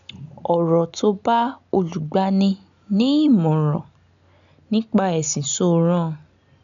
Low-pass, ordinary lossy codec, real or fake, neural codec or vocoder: 7.2 kHz; none; real; none